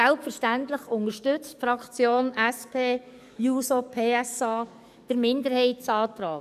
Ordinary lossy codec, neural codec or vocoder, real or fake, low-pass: none; codec, 44.1 kHz, 7.8 kbps, DAC; fake; 14.4 kHz